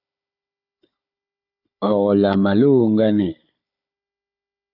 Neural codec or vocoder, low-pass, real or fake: codec, 16 kHz, 16 kbps, FunCodec, trained on Chinese and English, 50 frames a second; 5.4 kHz; fake